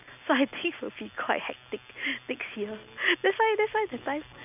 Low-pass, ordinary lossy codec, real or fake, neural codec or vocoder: 3.6 kHz; none; real; none